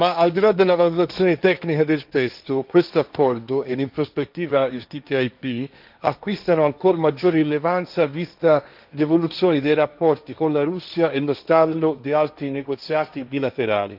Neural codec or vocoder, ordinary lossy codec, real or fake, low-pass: codec, 16 kHz, 1.1 kbps, Voila-Tokenizer; none; fake; 5.4 kHz